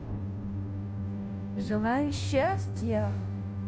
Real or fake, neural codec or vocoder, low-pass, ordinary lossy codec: fake; codec, 16 kHz, 0.5 kbps, FunCodec, trained on Chinese and English, 25 frames a second; none; none